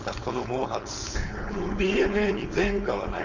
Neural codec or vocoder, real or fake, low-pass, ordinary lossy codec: codec, 16 kHz, 4.8 kbps, FACodec; fake; 7.2 kHz; none